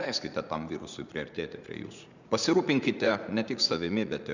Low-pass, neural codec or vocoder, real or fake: 7.2 kHz; vocoder, 44.1 kHz, 80 mel bands, Vocos; fake